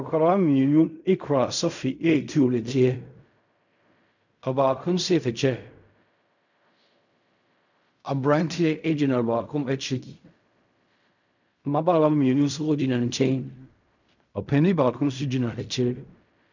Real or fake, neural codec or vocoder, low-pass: fake; codec, 16 kHz in and 24 kHz out, 0.4 kbps, LongCat-Audio-Codec, fine tuned four codebook decoder; 7.2 kHz